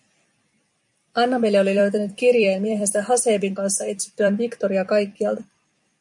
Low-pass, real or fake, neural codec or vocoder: 10.8 kHz; fake; vocoder, 44.1 kHz, 128 mel bands every 256 samples, BigVGAN v2